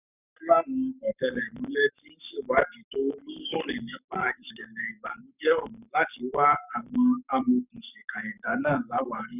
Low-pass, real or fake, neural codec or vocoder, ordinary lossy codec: 3.6 kHz; fake; codec, 44.1 kHz, 7.8 kbps, Pupu-Codec; none